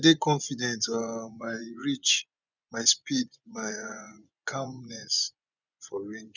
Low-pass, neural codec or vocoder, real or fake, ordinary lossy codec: 7.2 kHz; vocoder, 24 kHz, 100 mel bands, Vocos; fake; none